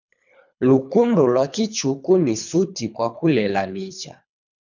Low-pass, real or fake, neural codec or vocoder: 7.2 kHz; fake; codec, 24 kHz, 3 kbps, HILCodec